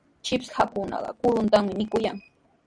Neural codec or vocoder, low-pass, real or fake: none; 9.9 kHz; real